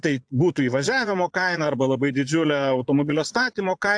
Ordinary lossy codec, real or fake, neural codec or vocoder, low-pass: AAC, 64 kbps; fake; vocoder, 22.05 kHz, 80 mel bands, Vocos; 9.9 kHz